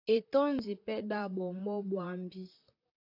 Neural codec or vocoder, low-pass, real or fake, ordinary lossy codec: vocoder, 44.1 kHz, 128 mel bands, Pupu-Vocoder; 5.4 kHz; fake; AAC, 32 kbps